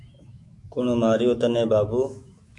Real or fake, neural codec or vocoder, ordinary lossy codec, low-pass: fake; autoencoder, 48 kHz, 128 numbers a frame, DAC-VAE, trained on Japanese speech; MP3, 64 kbps; 10.8 kHz